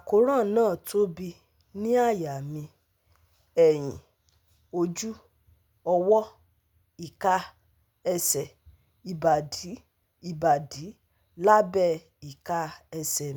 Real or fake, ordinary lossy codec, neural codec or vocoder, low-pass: real; none; none; none